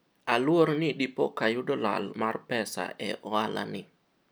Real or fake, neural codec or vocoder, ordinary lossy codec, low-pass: real; none; none; none